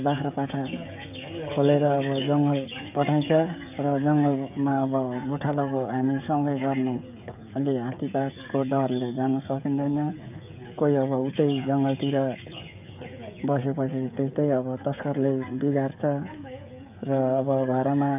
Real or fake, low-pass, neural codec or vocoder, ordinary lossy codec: fake; 3.6 kHz; codec, 16 kHz, 16 kbps, FreqCodec, smaller model; none